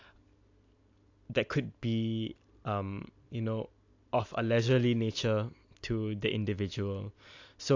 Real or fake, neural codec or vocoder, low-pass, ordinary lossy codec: real; none; 7.2 kHz; AAC, 48 kbps